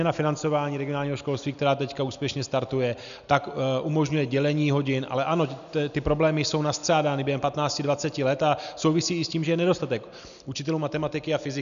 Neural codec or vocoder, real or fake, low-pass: none; real; 7.2 kHz